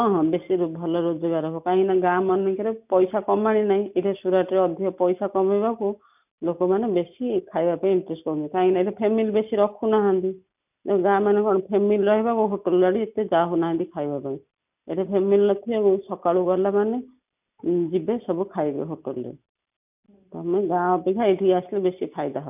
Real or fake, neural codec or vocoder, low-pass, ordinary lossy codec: real; none; 3.6 kHz; none